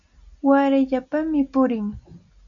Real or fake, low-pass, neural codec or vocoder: real; 7.2 kHz; none